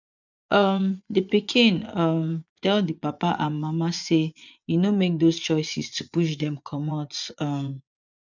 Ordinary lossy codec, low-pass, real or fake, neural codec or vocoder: none; 7.2 kHz; fake; vocoder, 24 kHz, 100 mel bands, Vocos